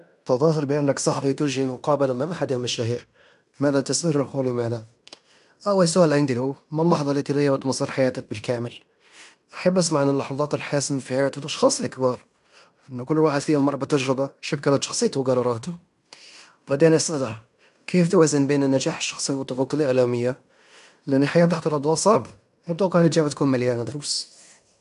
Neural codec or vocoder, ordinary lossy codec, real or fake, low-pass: codec, 16 kHz in and 24 kHz out, 0.9 kbps, LongCat-Audio-Codec, fine tuned four codebook decoder; none; fake; 10.8 kHz